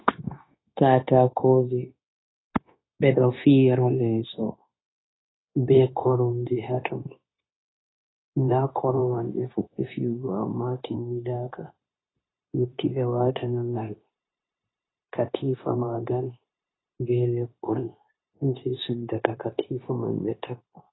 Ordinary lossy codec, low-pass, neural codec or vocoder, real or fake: AAC, 16 kbps; 7.2 kHz; codec, 16 kHz, 0.9 kbps, LongCat-Audio-Codec; fake